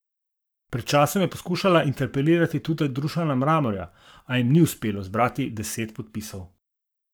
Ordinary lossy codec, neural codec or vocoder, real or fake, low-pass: none; codec, 44.1 kHz, 7.8 kbps, Pupu-Codec; fake; none